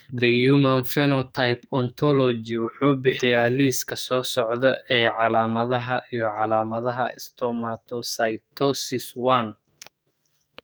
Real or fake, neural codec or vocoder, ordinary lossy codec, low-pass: fake; codec, 44.1 kHz, 2.6 kbps, SNAC; none; none